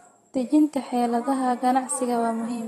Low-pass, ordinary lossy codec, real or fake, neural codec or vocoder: 19.8 kHz; AAC, 32 kbps; fake; vocoder, 44.1 kHz, 128 mel bands every 512 samples, BigVGAN v2